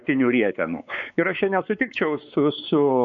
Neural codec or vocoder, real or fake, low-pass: codec, 16 kHz, 4 kbps, X-Codec, WavLM features, trained on Multilingual LibriSpeech; fake; 7.2 kHz